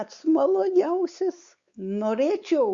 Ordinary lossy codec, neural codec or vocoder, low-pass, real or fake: Opus, 64 kbps; codec, 16 kHz, 4 kbps, X-Codec, WavLM features, trained on Multilingual LibriSpeech; 7.2 kHz; fake